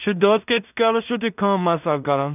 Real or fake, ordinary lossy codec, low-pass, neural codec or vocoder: fake; none; 3.6 kHz; codec, 16 kHz in and 24 kHz out, 0.4 kbps, LongCat-Audio-Codec, two codebook decoder